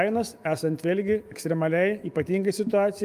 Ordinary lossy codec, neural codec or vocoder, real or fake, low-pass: Opus, 32 kbps; autoencoder, 48 kHz, 128 numbers a frame, DAC-VAE, trained on Japanese speech; fake; 14.4 kHz